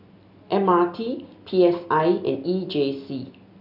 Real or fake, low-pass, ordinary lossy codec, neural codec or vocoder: real; 5.4 kHz; none; none